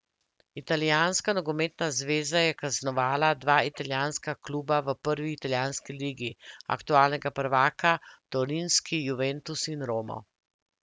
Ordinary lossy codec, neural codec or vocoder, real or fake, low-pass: none; none; real; none